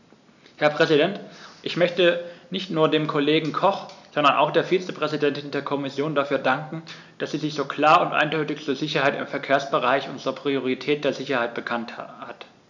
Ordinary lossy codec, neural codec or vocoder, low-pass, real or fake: none; none; 7.2 kHz; real